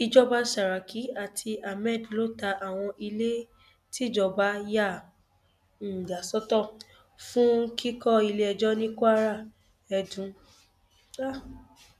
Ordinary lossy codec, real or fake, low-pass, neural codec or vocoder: none; real; 14.4 kHz; none